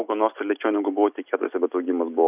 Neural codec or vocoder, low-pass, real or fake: none; 3.6 kHz; real